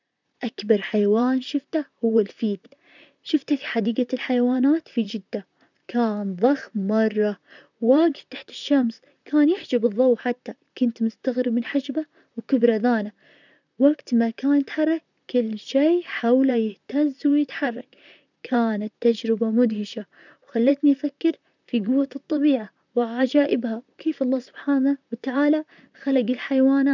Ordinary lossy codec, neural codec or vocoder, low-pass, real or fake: none; vocoder, 44.1 kHz, 128 mel bands, Pupu-Vocoder; 7.2 kHz; fake